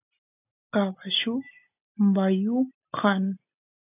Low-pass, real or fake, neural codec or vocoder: 3.6 kHz; real; none